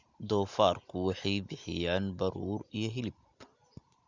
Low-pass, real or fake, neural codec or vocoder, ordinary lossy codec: 7.2 kHz; real; none; Opus, 64 kbps